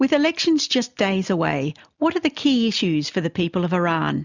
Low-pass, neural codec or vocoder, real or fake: 7.2 kHz; none; real